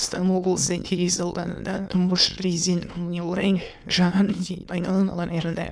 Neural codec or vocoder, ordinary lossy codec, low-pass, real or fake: autoencoder, 22.05 kHz, a latent of 192 numbers a frame, VITS, trained on many speakers; none; none; fake